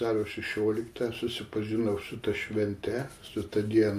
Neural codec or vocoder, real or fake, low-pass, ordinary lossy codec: none; real; 14.4 kHz; MP3, 64 kbps